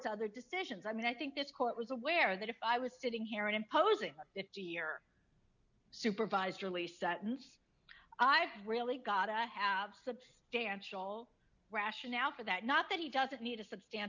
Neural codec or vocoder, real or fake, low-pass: none; real; 7.2 kHz